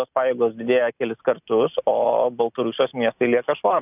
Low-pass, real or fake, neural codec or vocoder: 3.6 kHz; real; none